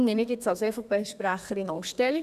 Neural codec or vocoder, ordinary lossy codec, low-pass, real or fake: codec, 32 kHz, 1.9 kbps, SNAC; none; 14.4 kHz; fake